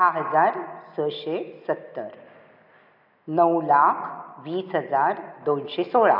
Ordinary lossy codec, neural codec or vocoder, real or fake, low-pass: none; none; real; 5.4 kHz